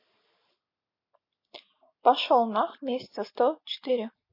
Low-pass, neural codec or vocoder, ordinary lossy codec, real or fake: 5.4 kHz; none; MP3, 32 kbps; real